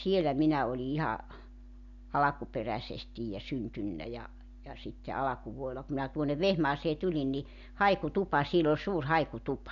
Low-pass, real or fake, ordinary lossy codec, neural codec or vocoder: 7.2 kHz; real; none; none